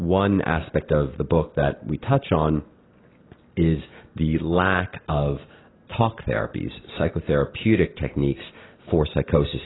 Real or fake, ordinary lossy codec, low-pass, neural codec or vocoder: real; AAC, 16 kbps; 7.2 kHz; none